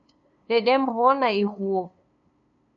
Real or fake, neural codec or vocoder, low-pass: fake; codec, 16 kHz, 2 kbps, FunCodec, trained on LibriTTS, 25 frames a second; 7.2 kHz